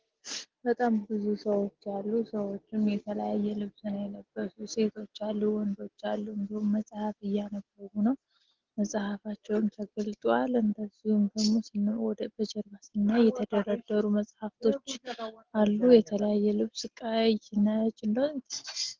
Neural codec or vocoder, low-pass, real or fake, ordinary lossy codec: none; 7.2 kHz; real; Opus, 16 kbps